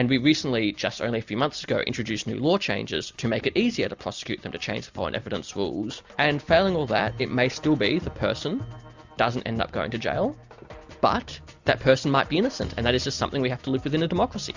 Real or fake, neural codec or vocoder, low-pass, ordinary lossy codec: real; none; 7.2 kHz; Opus, 64 kbps